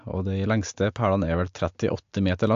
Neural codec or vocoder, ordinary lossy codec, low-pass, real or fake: none; AAC, 64 kbps; 7.2 kHz; real